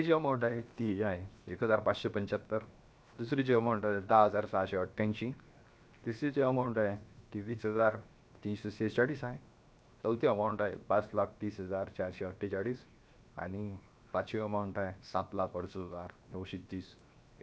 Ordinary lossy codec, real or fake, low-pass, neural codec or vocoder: none; fake; none; codec, 16 kHz, 0.7 kbps, FocalCodec